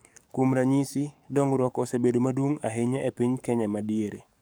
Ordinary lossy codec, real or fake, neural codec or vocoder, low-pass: none; fake; codec, 44.1 kHz, 7.8 kbps, DAC; none